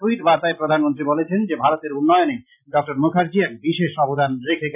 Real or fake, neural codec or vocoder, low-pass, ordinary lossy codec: real; none; 3.6 kHz; none